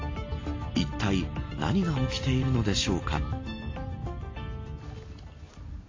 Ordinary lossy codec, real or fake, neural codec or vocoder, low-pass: AAC, 32 kbps; real; none; 7.2 kHz